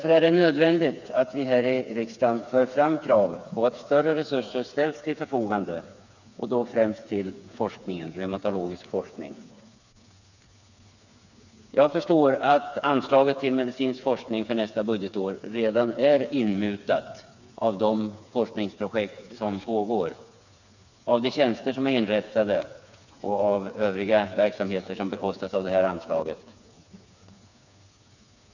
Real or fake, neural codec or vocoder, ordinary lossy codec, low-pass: fake; codec, 16 kHz, 4 kbps, FreqCodec, smaller model; none; 7.2 kHz